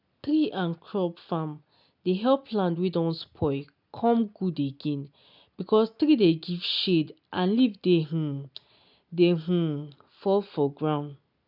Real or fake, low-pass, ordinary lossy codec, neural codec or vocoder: real; 5.4 kHz; none; none